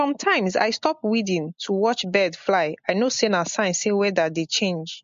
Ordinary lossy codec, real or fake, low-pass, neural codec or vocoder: MP3, 48 kbps; real; 7.2 kHz; none